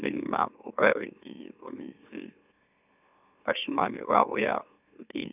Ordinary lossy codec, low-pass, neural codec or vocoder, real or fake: none; 3.6 kHz; autoencoder, 44.1 kHz, a latent of 192 numbers a frame, MeloTTS; fake